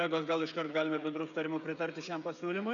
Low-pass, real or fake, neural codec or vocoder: 7.2 kHz; fake; codec, 16 kHz, 16 kbps, FreqCodec, smaller model